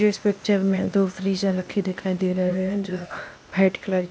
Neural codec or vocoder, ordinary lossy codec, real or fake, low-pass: codec, 16 kHz, 0.8 kbps, ZipCodec; none; fake; none